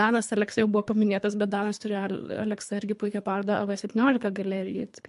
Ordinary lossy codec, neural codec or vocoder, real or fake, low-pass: MP3, 64 kbps; codec, 24 kHz, 3 kbps, HILCodec; fake; 10.8 kHz